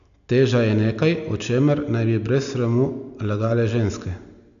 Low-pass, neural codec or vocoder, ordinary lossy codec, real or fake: 7.2 kHz; none; none; real